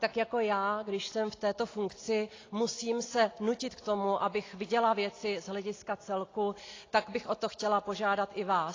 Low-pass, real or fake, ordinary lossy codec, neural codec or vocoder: 7.2 kHz; real; AAC, 32 kbps; none